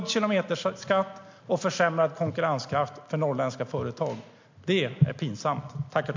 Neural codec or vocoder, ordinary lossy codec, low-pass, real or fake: none; MP3, 48 kbps; 7.2 kHz; real